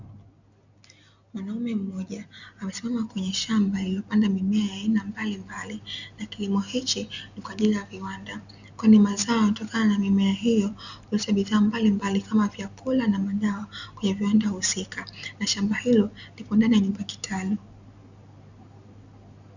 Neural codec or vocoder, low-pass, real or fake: none; 7.2 kHz; real